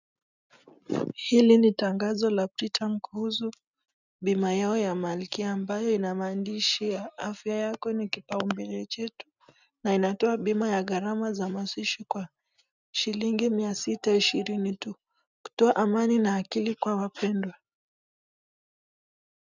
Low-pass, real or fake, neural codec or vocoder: 7.2 kHz; real; none